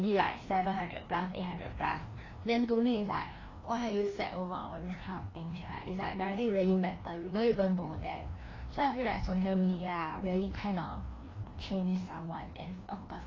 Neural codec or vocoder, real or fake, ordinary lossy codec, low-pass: codec, 16 kHz, 1 kbps, FreqCodec, larger model; fake; AAC, 32 kbps; 7.2 kHz